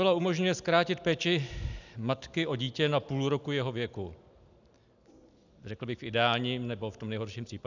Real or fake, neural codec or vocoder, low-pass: real; none; 7.2 kHz